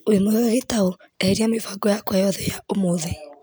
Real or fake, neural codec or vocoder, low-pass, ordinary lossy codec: real; none; none; none